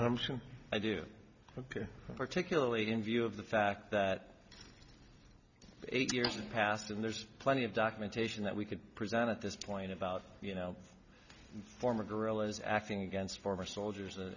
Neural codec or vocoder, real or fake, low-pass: none; real; 7.2 kHz